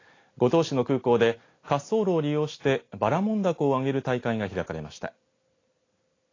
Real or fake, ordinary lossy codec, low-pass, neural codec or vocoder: real; AAC, 32 kbps; 7.2 kHz; none